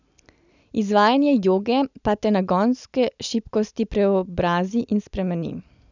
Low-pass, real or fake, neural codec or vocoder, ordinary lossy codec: 7.2 kHz; real; none; none